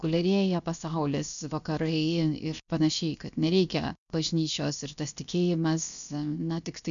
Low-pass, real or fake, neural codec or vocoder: 7.2 kHz; fake; codec, 16 kHz, 0.7 kbps, FocalCodec